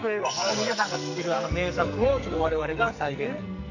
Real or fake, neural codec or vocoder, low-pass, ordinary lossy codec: fake; codec, 44.1 kHz, 2.6 kbps, SNAC; 7.2 kHz; none